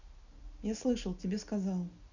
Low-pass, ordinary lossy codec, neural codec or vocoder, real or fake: 7.2 kHz; none; none; real